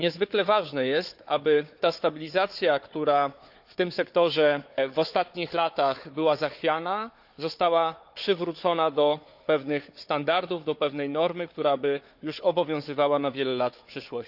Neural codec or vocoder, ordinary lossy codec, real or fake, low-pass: codec, 16 kHz, 4 kbps, FunCodec, trained on Chinese and English, 50 frames a second; none; fake; 5.4 kHz